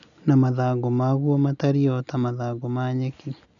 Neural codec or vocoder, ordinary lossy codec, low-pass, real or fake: none; none; 7.2 kHz; real